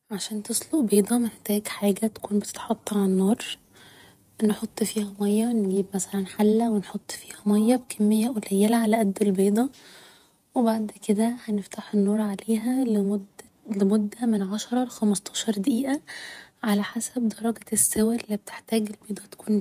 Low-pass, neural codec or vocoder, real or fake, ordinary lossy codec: 14.4 kHz; vocoder, 48 kHz, 128 mel bands, Vocos; fake; none